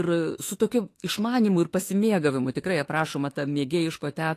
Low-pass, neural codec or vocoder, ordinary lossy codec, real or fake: 14.4 kHz; autoencoder, 48 kHz, 32 numbers a frame, DAC-VAE, trained on Japanese speech; AAC, 48 kbps; fake